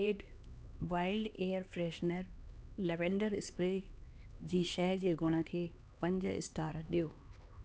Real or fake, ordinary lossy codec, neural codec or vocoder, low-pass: fake; none; codec, 16 kHz, 2 kbps, X-Codec, HuBERT features, trained on LibriSpeech; none